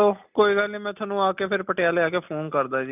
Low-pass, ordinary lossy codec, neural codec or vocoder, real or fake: 3.6 kHz; none; none; real